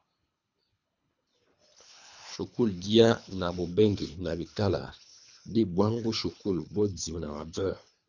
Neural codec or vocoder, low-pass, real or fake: codec, 24 kHz, 3 kbps, HILCodec; 7.2 kHz; fake